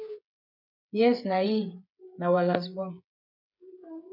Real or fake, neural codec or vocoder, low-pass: fake; codec, 16 kHz, 8 kbps, FreqCodec, smaller model; 5.4 kHz